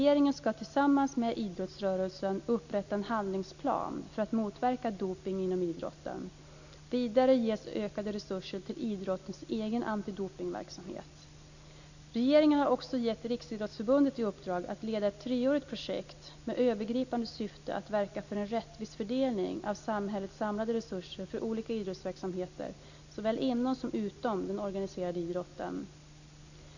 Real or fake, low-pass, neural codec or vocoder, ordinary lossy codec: real; 7.2 kHz; none; none